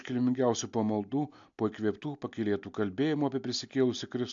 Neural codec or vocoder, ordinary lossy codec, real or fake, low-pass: none; AAC, 64 kbps; real; 7.2 kHz